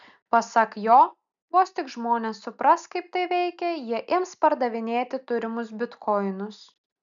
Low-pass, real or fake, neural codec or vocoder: 7.2 kHz; real; none